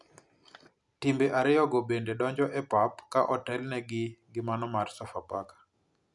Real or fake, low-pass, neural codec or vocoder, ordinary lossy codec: real; 10.8 kHz; none; none